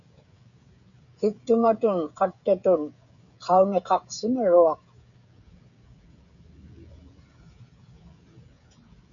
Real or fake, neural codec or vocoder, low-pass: fake; codec, 16 kHz, 16 kbps, FreqCodec, smaller model; 7.2 kHz